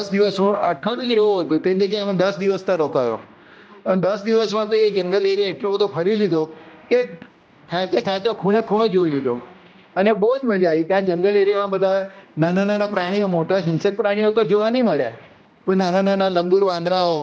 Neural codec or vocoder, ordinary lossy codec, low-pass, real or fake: codec, 16 kHz, 1 kbps, X-Codec, HuBERT features, trained on general audio; none; none; fake